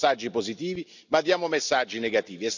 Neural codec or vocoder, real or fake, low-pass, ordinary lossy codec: none; real; 7.2 kHz; none